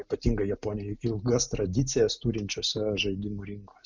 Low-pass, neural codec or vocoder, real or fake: 7.2 kHz; none; real